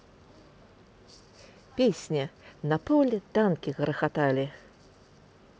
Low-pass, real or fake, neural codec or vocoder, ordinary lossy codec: none; real; none; none